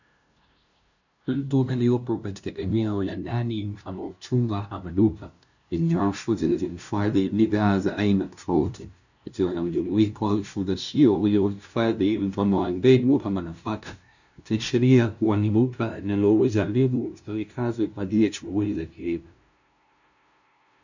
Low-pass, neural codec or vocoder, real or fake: 7.2 kHz; codec, 16 kHz, 0.5 kbps, FunCodec, trained on LibriTTS, 25 frames a second; fake